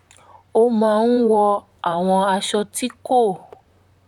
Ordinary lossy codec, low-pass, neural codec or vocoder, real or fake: none; 19.8 kHz; vocoder, 44.1 kHz, 128 mel bands, Pupu-Vocoder; fake